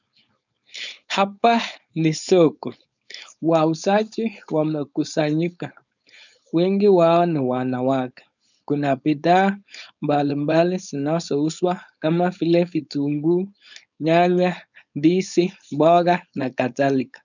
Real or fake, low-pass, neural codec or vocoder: fake; 7.2 kHz; codec, 16 kHz, 4.8 kbps, FACodec